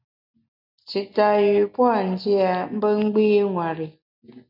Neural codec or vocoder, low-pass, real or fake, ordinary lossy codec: none; 5.4 kHz; real; MP3, 48 kbps